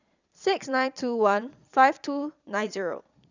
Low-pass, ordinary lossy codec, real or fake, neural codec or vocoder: 7.2 kHz; none; fake; vocoder, 22.05 kHz, 80 mel bands, Vocos